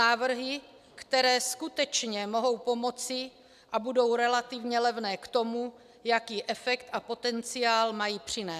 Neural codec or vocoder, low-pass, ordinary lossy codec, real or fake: none; 14.4 kHz; AAC, 96 kbps; real